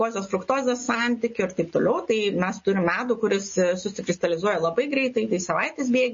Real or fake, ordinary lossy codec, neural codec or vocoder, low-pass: real; MP3, 32 kbps; none; 7.2 kHz